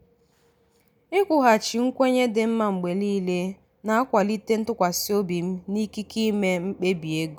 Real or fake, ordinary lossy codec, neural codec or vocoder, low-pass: real; none; none; none